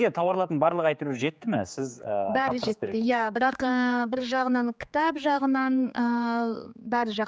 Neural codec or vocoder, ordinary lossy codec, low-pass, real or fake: codec, 16 kHz, 4 kbps, X-Codec, HuBERT features, trained on general audio; none; none; fake